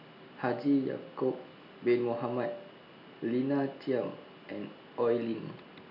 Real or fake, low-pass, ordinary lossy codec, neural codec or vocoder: real; 5.4 kHz; none; none